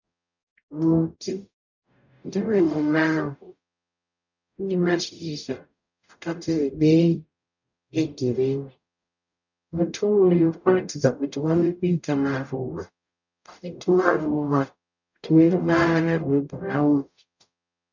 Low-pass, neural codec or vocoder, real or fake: 7.2 kHz; codec, 44.1 kHz, 0.9 kbps, DAC; fake